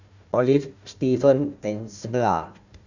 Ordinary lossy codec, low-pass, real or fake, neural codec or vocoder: none; 7.2 kHz; fake; codec, 16 kHz, 1 kbps, FunCodec, trained on Chinese and English, 50 frames a second